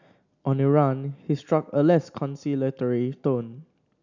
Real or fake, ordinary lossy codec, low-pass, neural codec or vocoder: real; none; 7.2 kHz; none